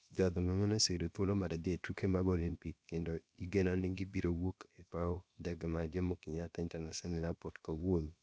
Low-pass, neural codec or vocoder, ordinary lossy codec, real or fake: none; codec, 16 kHz, about 1 kbps, DyCAST, with the encoder's durations; none; fake